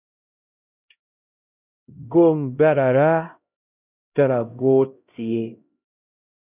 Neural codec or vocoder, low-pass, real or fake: codec, 16 kHz, 0.5 kbps, X-Codec, HuBERT features, trained on LibriSpeech; 3.6 kHz; fake